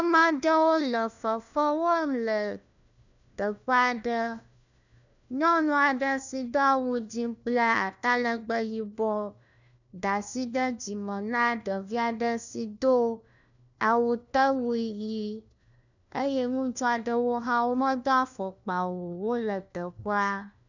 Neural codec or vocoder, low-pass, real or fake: codec, 16 kHz, 1 kbps, FunCodec, trained on LibriTTS, 50 frames a second; 7.2 kHz; fake